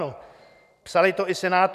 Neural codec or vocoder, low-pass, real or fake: none; 14.4 kHz; real